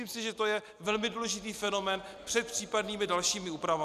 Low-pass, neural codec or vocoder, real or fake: 14.4 kHz; none; real